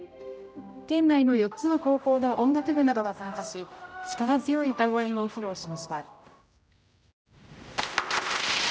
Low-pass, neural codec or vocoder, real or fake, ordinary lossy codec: none; codec, 16 kHz, 0.5 kbps, X-Codec, HuBERT features, trained on general audio; fake; none